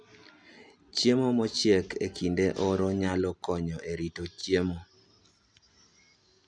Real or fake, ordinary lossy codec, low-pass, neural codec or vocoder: real; none; 9.9 kHz; none